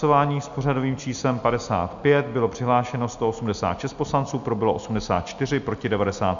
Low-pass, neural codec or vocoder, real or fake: 7.2 kHz; none; real